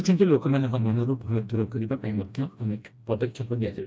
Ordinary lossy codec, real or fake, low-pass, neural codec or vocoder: none; fake; none; codec, 16 kHz, 1 kbps, FreqCodec, smaller model